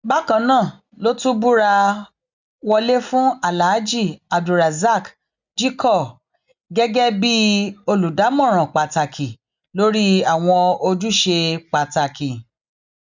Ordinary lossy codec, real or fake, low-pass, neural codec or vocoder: none; real; 7.2 kHz; none